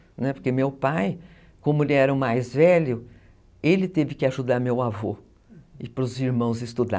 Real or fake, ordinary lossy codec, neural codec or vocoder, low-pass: real; none; none; none